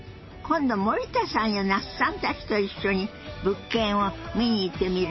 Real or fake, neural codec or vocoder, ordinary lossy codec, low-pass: real; none; MP3, 24 kbps; 7.2 kHz